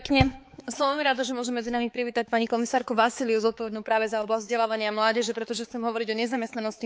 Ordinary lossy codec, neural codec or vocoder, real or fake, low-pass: none; codec, 16 kHz, 4 kbps, X-Codec, HuBERT features, trained on balanced general audio; fake; none